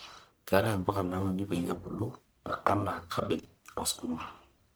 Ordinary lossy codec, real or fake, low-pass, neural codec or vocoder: none; fake; none; codec, 44.1 kHz, 1.7 kbps, Pupu-Codec